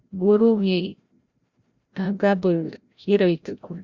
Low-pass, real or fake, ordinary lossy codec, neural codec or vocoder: 7.2 kHz; fake; Opus, 64 kbps; codec, 16 kHz, 0.5 kbps, FreqCodec, larger model